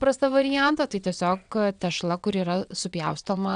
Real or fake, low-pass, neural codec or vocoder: fake; 9.9 kHz; vocoder, 22.05 kHz, 80 mel bands, WaveNeXt